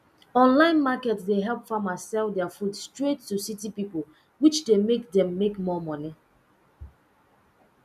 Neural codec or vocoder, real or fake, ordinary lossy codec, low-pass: none; real; none; 14.4 kHz